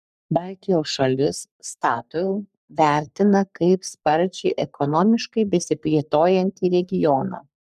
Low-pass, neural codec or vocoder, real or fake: 14.4 kHz; codec, 44.1 kHz, 3.4 kbps, Pupu-Codec; fake